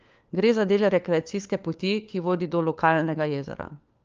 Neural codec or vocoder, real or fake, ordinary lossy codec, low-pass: codec, 16 kHz, 4 kbps, FunCodec, trained on LibriTTS, 50 frames a second; fake; Opus, 24 kbps; 7.2 kHz